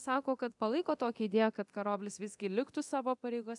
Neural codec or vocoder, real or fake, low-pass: codec, 24 kHz, 0.9 kbps, DualCodec; fake; 10.8 kHz